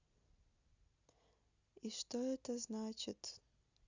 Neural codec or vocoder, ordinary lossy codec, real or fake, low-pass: none; Opus, 64 kbps; real; 7.2 kHz